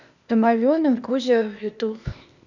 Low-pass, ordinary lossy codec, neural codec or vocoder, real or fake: 7.2 kHz; none; codec, 16 kHz, 0.8 kbps, ZipCodec; fake